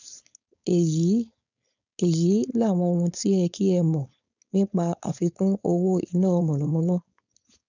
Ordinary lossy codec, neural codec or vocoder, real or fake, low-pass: none; codec, 16 kHz, 4.8 kbps, FACodec; fake; 7.2 kHz